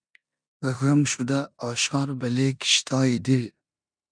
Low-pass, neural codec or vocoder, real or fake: 9.9 kHz; codec, 16 kHz in and 24 kHz out, 0.9 kbps, LongCat-Audio-Codec, four codebook decoder; fake